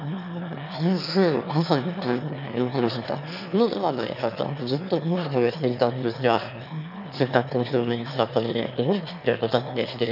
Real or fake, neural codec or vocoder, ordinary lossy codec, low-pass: fake; autoencoder, 22.05 kHz, a latent of 192 numbers a frame, VITS, trained on one speaker; none; 5.4 kHz